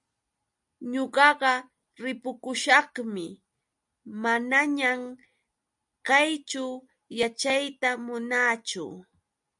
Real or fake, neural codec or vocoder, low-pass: real; none; 10.8 kHz